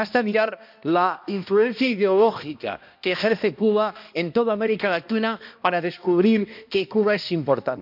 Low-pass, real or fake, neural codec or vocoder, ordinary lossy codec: 5.4 kHz; fake; codec, 16 kHz, 1 kbps, X-Codec, HuBERT features, trained on balanced general audio; MP3, 48 kbps